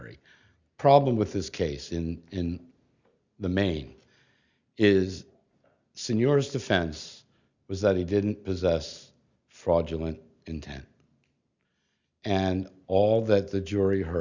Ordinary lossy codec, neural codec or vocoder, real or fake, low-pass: Opus, 64 kbps; none; real; 7.2 kHz